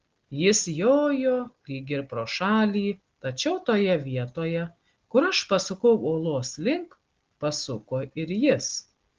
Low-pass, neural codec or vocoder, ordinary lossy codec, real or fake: 7.2 kHz; none; Opus, 16 kbps; real